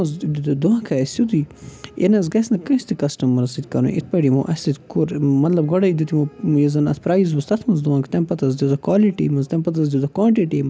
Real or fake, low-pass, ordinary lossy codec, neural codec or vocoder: real; none; none; none